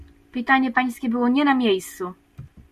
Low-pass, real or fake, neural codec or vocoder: 14.4 kHz; real; none